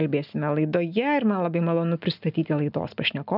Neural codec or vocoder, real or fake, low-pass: none; real; 5.4 kHz